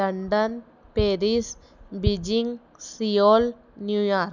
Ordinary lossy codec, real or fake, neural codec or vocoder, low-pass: none; real; none; 7.2 kHz